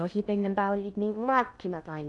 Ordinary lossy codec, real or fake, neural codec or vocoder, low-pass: none; fake; codec, 16 kHz in and 24 kHz out, 0.6 kbps, FocalCodec, streaming, 2048 codes; 10.8 kHz